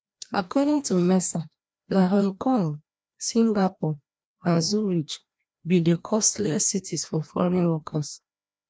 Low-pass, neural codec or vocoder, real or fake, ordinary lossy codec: none; codec, 16 kHz, 1 kbps, FreqCodec, larger model; fake; none